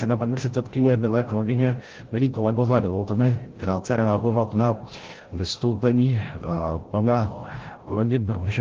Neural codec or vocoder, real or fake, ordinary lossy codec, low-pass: codec, 16 kHz, 0.5 kbps, FreqCodec, larger model; fake; Opus, 16 kbps; 7.2 kHz